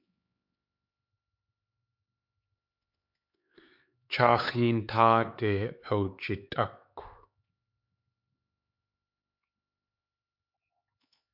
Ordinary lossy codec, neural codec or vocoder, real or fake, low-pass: MP3, 48 kbps; codec, 16 kHz, 4 kbps, X-Codec, HuBERT features, trained on LibriSpeech; fake; 5.4 kHz